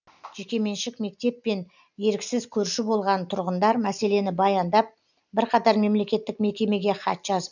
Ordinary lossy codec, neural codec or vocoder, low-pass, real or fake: none; none; 7.2 kHz; real